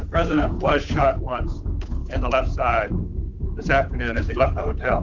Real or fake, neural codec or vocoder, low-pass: fake; codec, 16 kHz, 8 kbps, FunCodec, trained on Chinese and English, 25 frames a second; 7.2 kHz